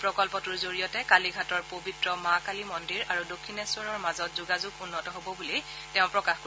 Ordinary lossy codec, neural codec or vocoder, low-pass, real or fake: none; none; none; real